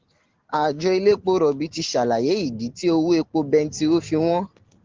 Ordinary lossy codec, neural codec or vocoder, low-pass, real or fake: Opus, 16 kbps; none; 7.2 kHz; real